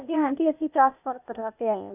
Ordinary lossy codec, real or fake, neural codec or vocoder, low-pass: none; fake; codec, 16 kHz, 0.8 kbps, ZipCodec; 3.6 kHz